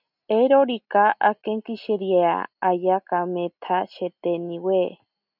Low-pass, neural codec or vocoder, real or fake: 5.4 kHz; none; real